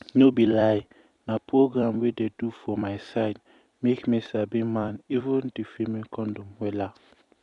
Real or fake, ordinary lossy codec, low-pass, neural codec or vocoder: fake; none; 10.8 kHz; vocoder, 44.1 kHz, 128 mel bands every 256 samples, BigVGAN v2